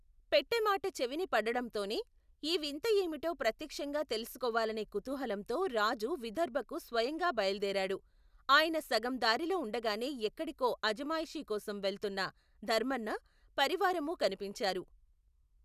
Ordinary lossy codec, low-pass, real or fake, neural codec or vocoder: none; 14.4 kHz; real; none